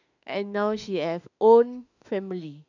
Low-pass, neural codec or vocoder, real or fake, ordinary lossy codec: 7.2 kHz; autoencoder, 48 kHz, 32 numbers a frame, DAC-VAE, trained on Japanese speech; fake; none